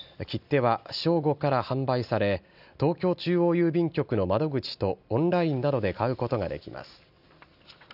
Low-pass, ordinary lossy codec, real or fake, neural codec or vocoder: 5.4 kHz; none; real; none